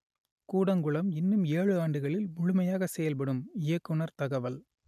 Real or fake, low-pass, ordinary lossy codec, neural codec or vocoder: real; 14.4 kHz; none; none